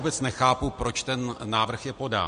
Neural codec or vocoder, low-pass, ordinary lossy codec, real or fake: none; 9.9 kHz; MP3, 48 kbps; real